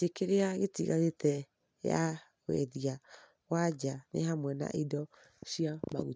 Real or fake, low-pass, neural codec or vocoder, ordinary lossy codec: real; none; none; none